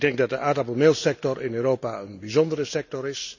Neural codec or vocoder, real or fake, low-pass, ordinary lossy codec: none; real; 7.2 kHz; none